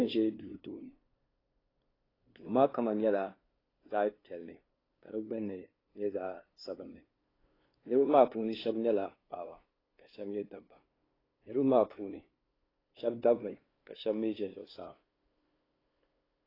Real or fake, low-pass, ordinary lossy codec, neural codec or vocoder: fake; 5.4 kHz; AAC, 24 kbps; codec, 16 kHz, 2 kbps, FunCodec, trained on LibriTTS, 25 frames a second